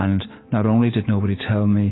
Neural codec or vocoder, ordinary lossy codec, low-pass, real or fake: vocoder, 44.1 kHz, 128 mel bands every 256 samples, BigVGAN v2; AAC, 16 kbps; 7.2 kHz; fake